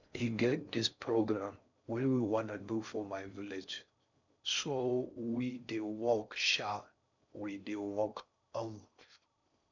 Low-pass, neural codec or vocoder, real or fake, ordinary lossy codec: 7.2 kHz; codec, 16 kHz in and 24 kHz out, 0.6 kbps, FocalCodec, streaming, 4096 codes; fake; none